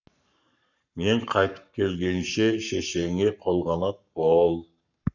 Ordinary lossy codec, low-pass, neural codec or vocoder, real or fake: none; 7.2 kHz; codec, 44.1 kHz, 7.8 kbps, Pupu-Codec; fake